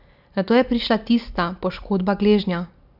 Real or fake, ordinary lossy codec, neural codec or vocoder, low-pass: real; none; none; 5.4 kHz